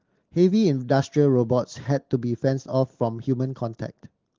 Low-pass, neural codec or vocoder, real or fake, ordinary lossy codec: 7.2 kHz; none; real; Opus, 16 kbps